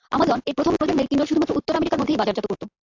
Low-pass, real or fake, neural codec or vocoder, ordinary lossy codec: 7.2 kHz; fake; vocoder, 44.1 kHz, 128 mel bands every 512 samples, BigVGAN v2; AAC, 48 kbps